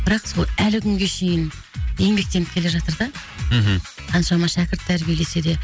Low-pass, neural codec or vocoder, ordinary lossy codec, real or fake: none; none; none; real